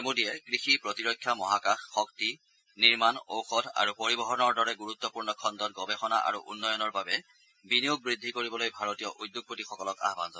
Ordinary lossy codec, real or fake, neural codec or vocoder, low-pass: none; real; none; none